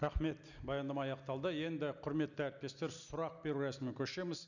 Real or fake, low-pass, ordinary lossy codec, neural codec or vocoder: real; 7.2 kHz; none; none